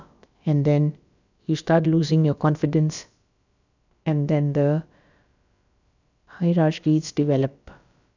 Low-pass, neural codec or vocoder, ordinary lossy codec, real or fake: 7.2 kHz; codec, 16 kHz, about 1 kbps, DyCAST, with the encoder's durations; none; fake